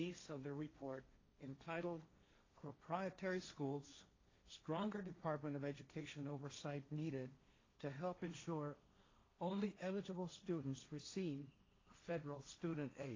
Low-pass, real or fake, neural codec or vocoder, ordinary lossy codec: 7.2 kHz; fake; codec, 16 kHz, 1.1 kbps, Voila-Tokenizer; AAC, 32 kbps